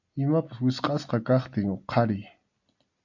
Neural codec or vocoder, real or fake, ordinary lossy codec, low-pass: none; real; AAC, 48 kbps; 7.2 kHz